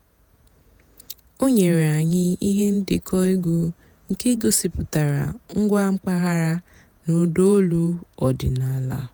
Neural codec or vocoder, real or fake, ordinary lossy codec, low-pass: vocoder, 48 kHz, 128 mel bands, Vocos; fake; none; none